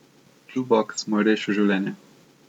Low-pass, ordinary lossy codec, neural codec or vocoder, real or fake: 19.8 kHz; none; none; real